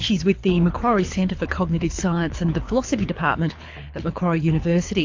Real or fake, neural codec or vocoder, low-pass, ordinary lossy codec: fake; codec, 24 kHz, 6 kbps, HILCodec; 7.2 kHz; AAC, 48 kbps